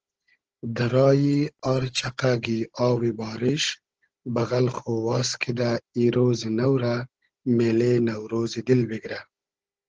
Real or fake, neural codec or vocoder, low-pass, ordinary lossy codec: fake; codec, 16 kHz, 16 kbps, FunCodec, trained on Chinese and English, 50 frames a second; 7.2 kHz; Opus, 16 kbps